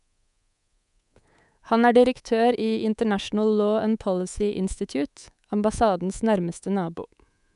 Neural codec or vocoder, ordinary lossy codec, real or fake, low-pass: codec, 24 kHz, 3.1 kbps, DualCodec; none; fake; 10.8 kHz